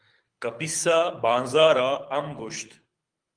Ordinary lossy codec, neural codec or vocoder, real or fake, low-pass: Opus, 32 kbps; codec, 24 kHz, 6 kbps, HILCodec; fake; 9.9 kHz